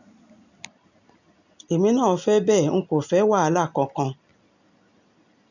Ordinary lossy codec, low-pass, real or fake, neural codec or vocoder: none; 7.2 kHz; real; none